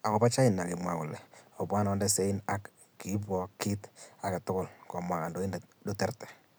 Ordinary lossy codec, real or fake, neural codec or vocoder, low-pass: none; real; none; none